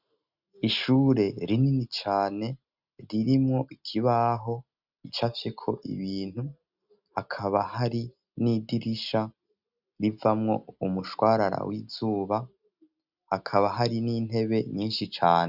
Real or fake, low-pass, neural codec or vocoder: real; 5.4 kHz; none